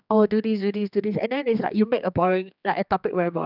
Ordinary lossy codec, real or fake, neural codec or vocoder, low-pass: none; fake; codec, 16 kHz, 2 kbps, X-Codec, HuBERT features, trained on general audio; 5.4 kHz